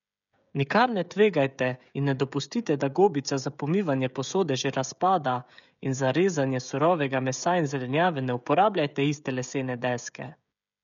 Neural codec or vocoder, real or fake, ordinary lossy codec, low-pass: codec, 16 kHz, 16 kbps, FreqCodec, smaller model; fake; none; 7.2 kHz